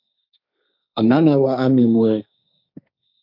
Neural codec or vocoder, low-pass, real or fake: codec, 16 kHz, 1.1 kbps, Voila-Tokenizer; 5.4 kHz; fake